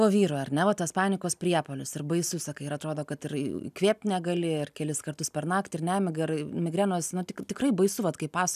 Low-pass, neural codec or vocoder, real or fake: 14.4 kHz; none; real